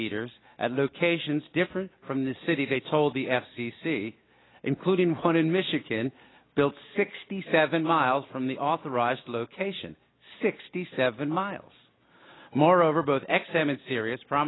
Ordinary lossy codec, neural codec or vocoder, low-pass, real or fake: AAC, 16 kbps; none; 7.2 kHz; real